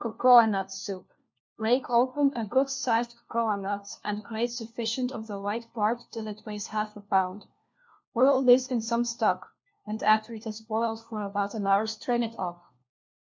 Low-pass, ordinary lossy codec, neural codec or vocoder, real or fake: 7.2 kHz; MP3, 48 kbps; codec, 16 kHz, 1 kbps, FunCodec, trained on LibriTTS, 50 frames a second; fake